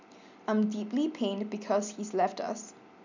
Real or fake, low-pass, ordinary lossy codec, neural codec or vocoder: real; 7.2 kHz; none; none